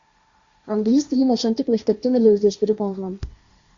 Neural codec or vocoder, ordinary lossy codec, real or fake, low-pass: codec, 16 kHz, 1.1 kbps, Voila-Tokenizer; Opus, 64 kbps; fake; 7.2 kHz